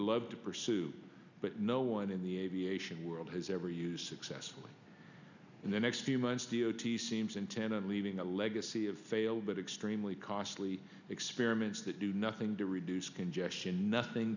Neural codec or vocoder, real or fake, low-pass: none; real; 7.2 kHz